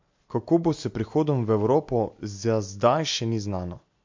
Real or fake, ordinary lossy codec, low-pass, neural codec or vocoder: real; MP3, 48 kbps; 7.2 kHz; none